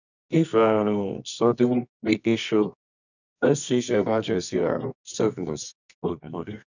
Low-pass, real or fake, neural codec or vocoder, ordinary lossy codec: 7.2 kHz; fake; codec, 24 kHz, 0.9 kbps, WavTokenizer, medium music audio release; none